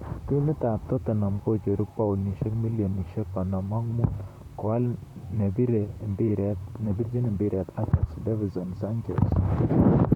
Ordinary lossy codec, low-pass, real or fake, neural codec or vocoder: none; 19.8 kHz; fake; vocoder, 44.1 kHz, 128 mel bands, Pupu-Vocoder